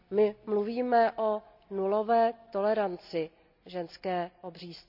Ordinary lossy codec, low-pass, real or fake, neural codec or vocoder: none; 5.4 kHz; real; none